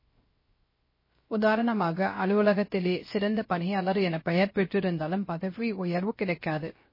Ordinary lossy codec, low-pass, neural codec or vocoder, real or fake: MP3, 24 kbps; 5.4 kHz; codec, 16 kHz, 0.3 kbps, FocalCodec; fake